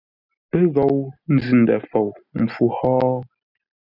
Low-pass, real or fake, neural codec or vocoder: 5.4 kHz; real; none